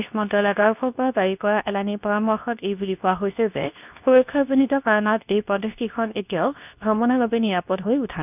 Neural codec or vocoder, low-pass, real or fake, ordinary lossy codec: codec, 24 kHz, 0.9 kbps, WavTokenizer, medium speech release version 2; 3.6 kHz; fake; none